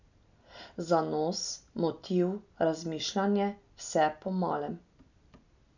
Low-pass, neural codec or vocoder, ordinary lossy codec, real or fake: 7.2 kHz; none; none; real